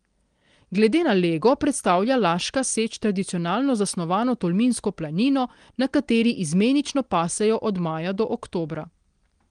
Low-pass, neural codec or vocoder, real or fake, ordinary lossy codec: 9.9 kHz; none; real; Opus, 24 kbps